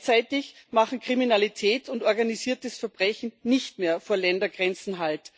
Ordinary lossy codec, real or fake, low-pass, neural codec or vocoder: none; real; none; none